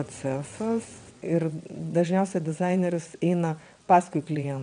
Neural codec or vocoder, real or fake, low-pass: vocoder, 22.05 kHz, 80 mel bands, WaveNeXt; fake; 9.9 kHz